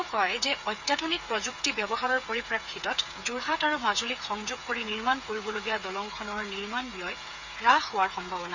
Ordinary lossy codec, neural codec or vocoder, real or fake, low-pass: none; codec, 16 kHz, 8 kbps, FreqCodec, smaller model; fake; 7.2 kHz